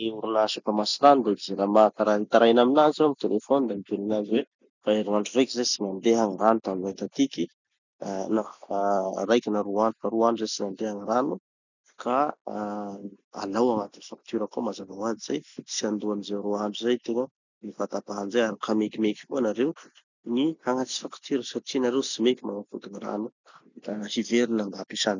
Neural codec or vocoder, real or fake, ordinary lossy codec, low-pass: none; real; none; 7.2 kHz